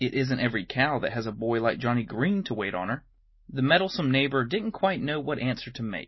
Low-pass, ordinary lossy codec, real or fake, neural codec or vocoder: 7.2 kHz; MP3, 24 kbps; real; none